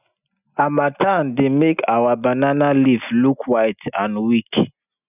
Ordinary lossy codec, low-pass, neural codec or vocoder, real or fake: none; 3.6 kHz; none; real